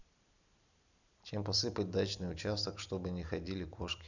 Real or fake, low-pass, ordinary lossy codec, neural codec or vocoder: real; 7.2 kHz; none; none